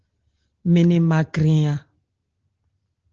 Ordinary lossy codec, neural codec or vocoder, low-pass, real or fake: Opus, 16 kbps; none; 7.2 kHz; real